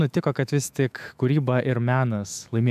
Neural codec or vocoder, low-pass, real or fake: autoencoder, 48 kHz, 128 numbers a frame, DAC-VAE, trained on Japanese speech; 14.4 kHz; fake